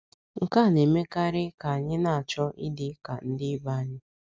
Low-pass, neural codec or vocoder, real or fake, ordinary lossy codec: none; none; real; none